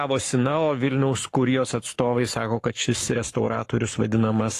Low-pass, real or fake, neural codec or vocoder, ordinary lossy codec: 14.4 kHz; fake; codec, 44.1 kHz, 7.8 kbps, Pupu-Codec; AAC, 48 kbps